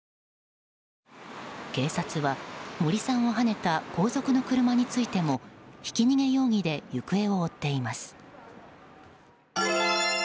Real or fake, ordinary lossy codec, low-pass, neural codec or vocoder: real; none; none; none